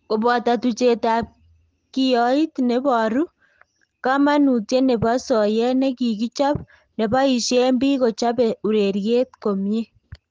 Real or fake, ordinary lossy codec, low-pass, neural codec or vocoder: real; Opus, 16 kbps; 7.2 kHz; none